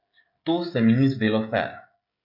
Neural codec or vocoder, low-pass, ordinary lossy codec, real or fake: codec, 16 kHz, 16 kbps, FreqCodec, smaller model; 5.4 kHz; MP3, 48 kbps; fake